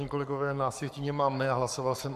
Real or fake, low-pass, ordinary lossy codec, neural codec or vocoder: fake; 14.4 kHz; AAC, 96 kbps; codec, 44.1 kHz, 7.8 kbps, Pupu-Codec